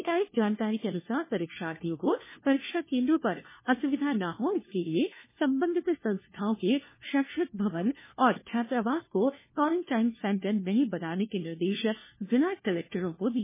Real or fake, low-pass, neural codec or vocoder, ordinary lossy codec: fake; 3.6 kHz; codec, 16 kHz, 1 kbps, FunCodec, trained on LibriTTS, 50 frames a second; MP3, 16 kbps